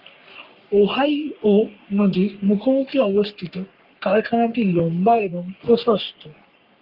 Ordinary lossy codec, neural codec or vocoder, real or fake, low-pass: Opus, 32 kbps; codec, 44.1 kHz, 3.4 kbps, Pupu-Codec; fake; 5.4 kHz